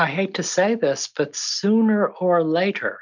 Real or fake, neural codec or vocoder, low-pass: real; none; 7.2 kHz